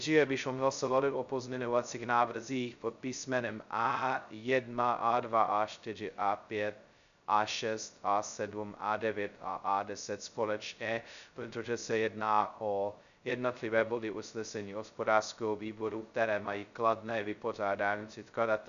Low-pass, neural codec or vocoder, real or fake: 7.2 kHz; codec, 16 kHz, 0.2 kbps, FocalCodec; fake